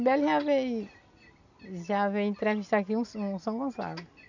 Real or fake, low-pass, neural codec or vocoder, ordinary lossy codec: real; 7.2 kHz; none; none